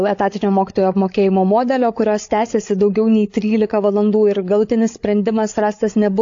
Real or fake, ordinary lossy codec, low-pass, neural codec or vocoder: fake; AAC, 48 kbps; 7.2 kHz; codec, 16 kHz, 8 kbps, FreqCodec, larger model